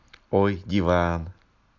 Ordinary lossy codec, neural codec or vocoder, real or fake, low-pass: none; none; real; 7.2 kHz